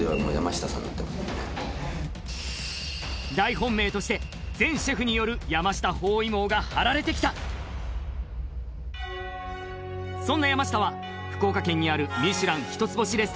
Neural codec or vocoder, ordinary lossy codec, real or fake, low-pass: none; none; real; none